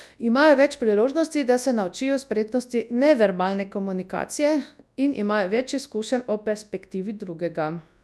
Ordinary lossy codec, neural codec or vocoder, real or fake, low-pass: none; codec, 24 kHz, 0.9 kbps, WavTokenizer, large speech release; fake; none